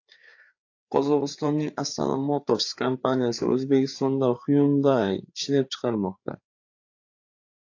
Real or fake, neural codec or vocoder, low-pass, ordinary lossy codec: fake; codec, 16 kHz, 4 kbps, FreqCodec, larger model; 7.2 kHz; AAC, 48 kbps